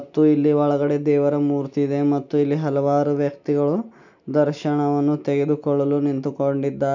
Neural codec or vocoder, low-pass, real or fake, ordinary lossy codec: none; 7.2 kHz; real; none